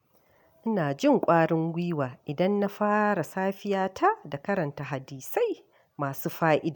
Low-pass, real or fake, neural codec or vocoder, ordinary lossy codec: 19.8 kHz; real; none; none